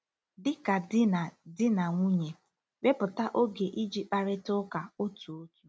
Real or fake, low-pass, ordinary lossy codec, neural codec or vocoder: real; none; none; none